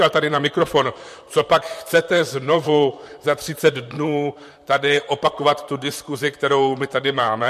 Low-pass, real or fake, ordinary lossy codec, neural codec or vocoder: 14.4 kHz; fake; MP3, 64 kbps; vocoder, 44.1 kHz, 128 mel bands, Pupu-Vocoder